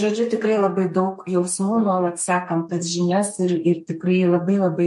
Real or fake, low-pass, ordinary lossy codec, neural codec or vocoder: fake; 14.4 kHz; MP3, 48 kbps; codec, 32 kHz, 1.9 kbps, SNAC